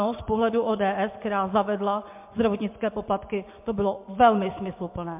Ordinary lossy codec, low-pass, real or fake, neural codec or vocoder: MP3, 32 kbps; 3.6 kHz; fake; vocoder, 24 kHz, 100 mel bands, Vocos